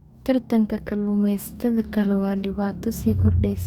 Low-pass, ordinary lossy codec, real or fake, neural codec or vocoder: 19.8 kHz; Opus, 64 kbps; fake; codec, 44.1 kHz, 2.6 kbps, DAC